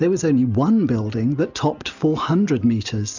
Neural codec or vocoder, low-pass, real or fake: none; 7.2 kHz; real